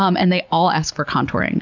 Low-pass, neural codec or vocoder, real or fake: 7.2 kHz; none; real